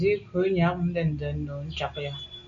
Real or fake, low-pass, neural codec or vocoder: real; 7.2 kHz; none